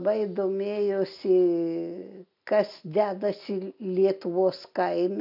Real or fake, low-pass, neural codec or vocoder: real; 5.4 kHz; none